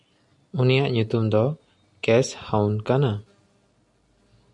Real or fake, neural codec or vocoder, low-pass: real; none; 10.8 kHz